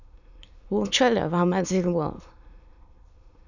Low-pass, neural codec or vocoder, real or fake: 7.2 kHz; autoencoder, 22.05 kHz, a latent of 192 numbers a frame, VITS, trained on many speakers; fake